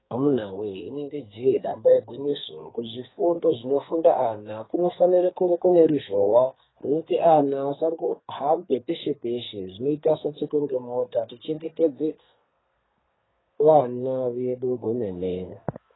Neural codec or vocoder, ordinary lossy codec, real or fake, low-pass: codec, 32 kHz, 1.9 kbps, SNAC; AAC, 16 kbps; fake; 7.2 kHz